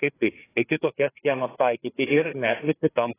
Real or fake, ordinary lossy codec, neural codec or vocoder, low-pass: fake; AAC, 16 kbps; codec, 16 kHz, 4 kbps, FreqCodec, larger model; 3.6 kHz